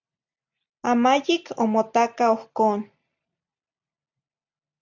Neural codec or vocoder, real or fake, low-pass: none; real; 7.2 kHz